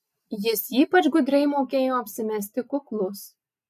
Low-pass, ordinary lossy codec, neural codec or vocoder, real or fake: 14.4 kHz; MP3, 64 kbps; none; real